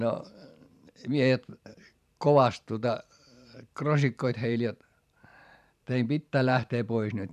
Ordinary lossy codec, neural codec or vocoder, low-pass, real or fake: none; vocoder, 44.1 kHz, 128 mel bands every 512 samples, BigVGAN v2; 14.4 kHz; fake